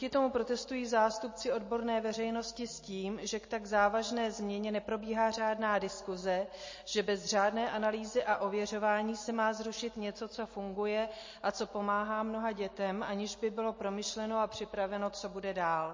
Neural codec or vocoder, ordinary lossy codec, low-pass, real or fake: none; MP3, 32 kbps; 7.2 kHz; real